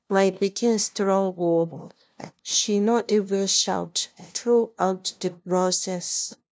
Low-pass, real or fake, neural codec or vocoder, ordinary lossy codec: none; fake; codec, 16 kHz, 0.5 kbps, FunCodec, trained on LibriTTS, 25 frames a second; none